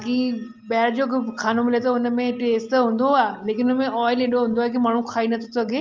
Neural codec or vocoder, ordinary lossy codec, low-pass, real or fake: none; Opus, 24 kbps; 7.2 kHz; real